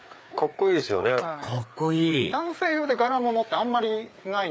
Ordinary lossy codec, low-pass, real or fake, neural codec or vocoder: none; none; fake; codec, 16 kHz, 4 kbps, FreqCodec, larger model